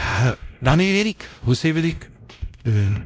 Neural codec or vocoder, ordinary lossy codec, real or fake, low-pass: codec, 16 kHz, 0.5 kbps, X-Codec, WavLM features, trained on Multilingual LibriSpeech; none; fake; none